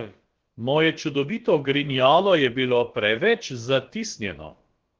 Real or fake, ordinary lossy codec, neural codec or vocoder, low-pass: fake; Opus, 16 kbps; codec, 16 kHz, about 1 kbps, DyCAST, with the encoder's durations; 7.2 kHz